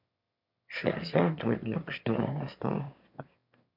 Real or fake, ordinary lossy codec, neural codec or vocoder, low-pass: fake; MP3, 48 kbps; autoencoder, 22.05 kHz, a latent of 192 numbers a frame, VITS, trained on one speaker; 5.4 kHz